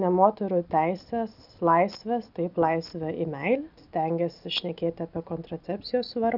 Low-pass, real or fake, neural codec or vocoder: 5.4 kHz; real; none